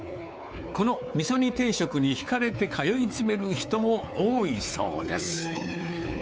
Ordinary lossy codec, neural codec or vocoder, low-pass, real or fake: none; codec, 16 kHz, 4 kbps, X-Codec, WavLM features, trained on Multilingual LibriSpeech; none; fake